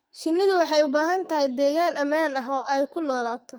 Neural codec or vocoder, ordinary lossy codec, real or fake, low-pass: codec, 44.1 kHz, 2.6 kbps, SNAC; none; fake; none